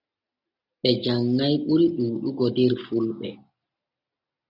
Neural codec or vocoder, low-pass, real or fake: none; 5.4 kHz; real